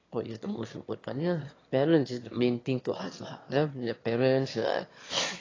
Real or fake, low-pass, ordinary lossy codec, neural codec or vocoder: fake; 7.2 kHz; AAC, 32 kbps; autoencoder, 22.05 kHz, a latent of 192 numbers a frame, VITS, trained on one speaker